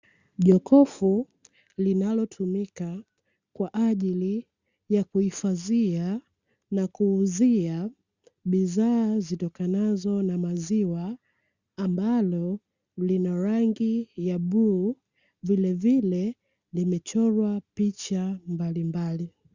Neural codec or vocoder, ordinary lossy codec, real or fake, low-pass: none; Opus, 64 kbps; real; 7.2 kHz